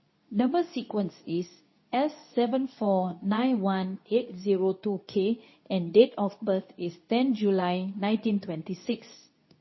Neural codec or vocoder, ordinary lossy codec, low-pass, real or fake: codec, 24 kHz, 0.9 kbps, WavTokenizer, medium speech release version 2; MP3, 24 kbps; 7.2 kHz; fake